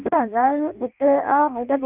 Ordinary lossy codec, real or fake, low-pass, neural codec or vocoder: Opus, 32 kbps; fake; 3.6 kHz; codec, 16 kHz in and 24 kHz out, 0.6 kbps, FireRedTTS-2 codec